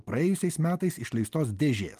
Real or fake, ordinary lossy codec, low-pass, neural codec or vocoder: real; Opus, 24 kbps; 14.4 kHz; none